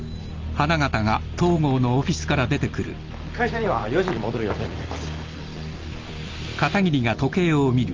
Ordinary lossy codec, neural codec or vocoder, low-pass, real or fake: Opus, 32 kbps; none; 7.2 kHz; real